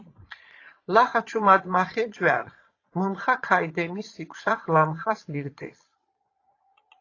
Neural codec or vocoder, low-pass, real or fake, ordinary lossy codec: vocoder, 22.05 kHz, 80 mel bands, Vocos; 7.2 kHz; fake; AAC, 32 kbps